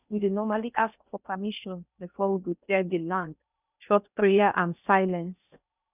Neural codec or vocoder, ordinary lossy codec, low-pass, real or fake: codec, 16 kHz in and 24 kHz out, 0.6 kbps, FocalCodec, streaming, 4096 codes; none; 3.6 kHz; fake